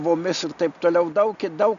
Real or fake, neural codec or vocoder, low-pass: real; none; 7.2 kHz